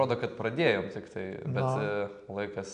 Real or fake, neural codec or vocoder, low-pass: real; none; 9.9 kHz